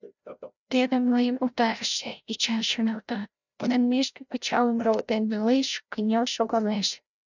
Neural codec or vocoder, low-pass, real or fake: codec, 16 kHz, 0.5 kbps, FreqCodec, larger model; 7.2 kHz; fake